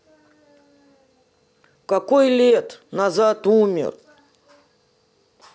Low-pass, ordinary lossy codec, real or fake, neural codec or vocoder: none; none; real; none